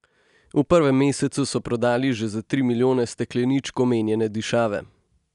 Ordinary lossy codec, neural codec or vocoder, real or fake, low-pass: none; none; real; 10.8 kHz